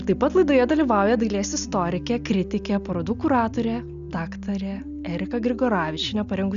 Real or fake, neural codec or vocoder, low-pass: real; none; 7.2 kHz